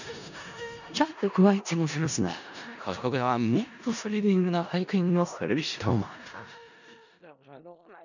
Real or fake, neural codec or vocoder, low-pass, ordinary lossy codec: fake; codec, 16 kHz in and 24 kHz out, 0.4 kbps, LongCat-Audio-Codec, four codebook decoder; 7.2 kHz; none